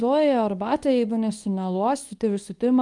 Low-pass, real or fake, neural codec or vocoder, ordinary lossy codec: 10.8 kHz; fake; codec, 24 kHz, 0.9 kbps, WavTokenizer, medium speech release version 2; Opus, 32 kbps